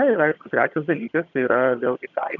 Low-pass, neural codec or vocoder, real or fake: 7.2 kHz; vocoder, 22.05 kHz, 80 mel bands, HiFi-GAN; fake